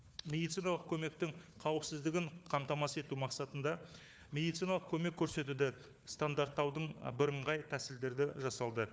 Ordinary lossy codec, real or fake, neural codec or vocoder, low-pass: none; fake; codec, 16 kHz, 4 kbps, FunCodec, trained on Chinese and English, 50 frames a second; none